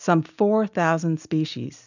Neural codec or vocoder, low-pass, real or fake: none; 7.2 kHz; real